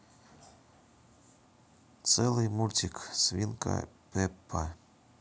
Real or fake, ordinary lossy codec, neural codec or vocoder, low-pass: real; none; none; none